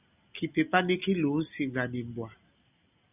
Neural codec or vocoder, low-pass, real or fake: none; 3.6 kHz; real